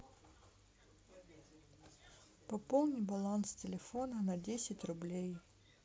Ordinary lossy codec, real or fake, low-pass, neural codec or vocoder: none; real; none; none